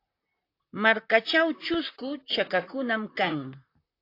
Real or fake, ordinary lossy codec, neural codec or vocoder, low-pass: fake; AAC, 32 kbps; vocoder, 44.1 kHz, 128 mel bands, Pupu-Vocoder; 5.4 kHz